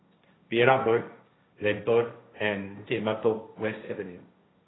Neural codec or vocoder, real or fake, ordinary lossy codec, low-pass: codec, 16 kHz, 1.1 kbps, Voila-Tokenizer; fake; AAC, 16 kbps; 7.2 kHz